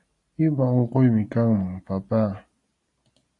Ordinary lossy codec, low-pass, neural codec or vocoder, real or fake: AAC, 48 kbps; 10.8 kHz; none; real